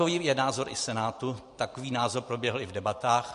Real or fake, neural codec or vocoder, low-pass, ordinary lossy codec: fake; vocoder, 44.1 kHz, 128 mel bands every 512 samples, BigVGAN v2; 14.4 kHz; MP3, 48 kbps